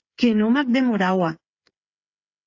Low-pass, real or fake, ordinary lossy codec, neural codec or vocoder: 7.2 kHz; fake; AAC, 48 kbps; codec, 16 kHz, 8 kbps, FreqCodec, smaller model